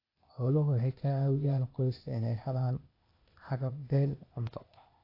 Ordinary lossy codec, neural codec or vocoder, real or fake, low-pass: AAC, 32 kbps; codec, 16 kHz, 0.8 kbps, ZipCodec; fake; 5.4 kHz